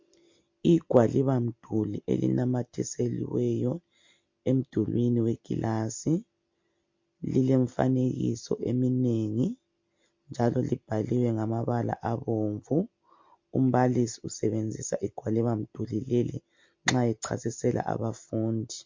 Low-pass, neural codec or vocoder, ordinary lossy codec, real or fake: 7.2 kHz; none; MP3, 48 kbps; real